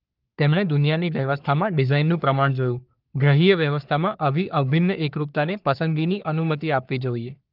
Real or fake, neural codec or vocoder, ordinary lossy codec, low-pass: fake; codec, 44.1 kHz, 3.4 kbps, Pupu-Codec; Opus, 32 kbps; 5.4 kHz